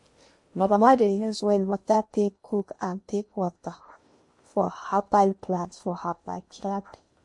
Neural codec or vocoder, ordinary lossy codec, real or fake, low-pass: codec, 16 kHz in and 24 kHz out, 0.8 kbps, FocalCodec, streaming, 65536 codes; MP3, 48 kbps; fake; 10.8 kHz